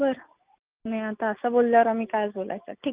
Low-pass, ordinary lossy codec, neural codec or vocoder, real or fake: 3.6 kHz; Opus, 32 kbps; none; real